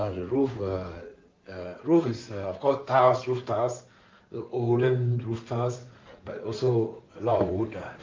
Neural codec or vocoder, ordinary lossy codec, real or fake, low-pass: codec, 16 kHz in and 24 kHz out, 2.2 kbps, FireRedTTS-2 codec; Opus, 24 kbps; fake; 7.2 kHz